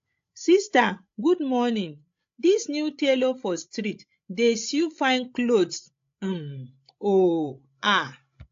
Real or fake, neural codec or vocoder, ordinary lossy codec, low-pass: fake; codec, 16 kHz, 16 kbps, FreqCodec, larger model; AAC, 48 kbps; 7.2 kHz